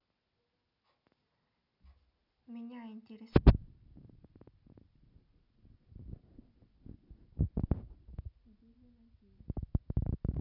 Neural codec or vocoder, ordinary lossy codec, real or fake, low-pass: none; none; real; 5.4 kHz